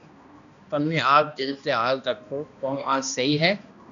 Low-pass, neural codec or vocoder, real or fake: 7.2 kHz; codec, 16 kHz, 1 kbps, X-Codec, HuBERT features, trained on balanced general audio; fake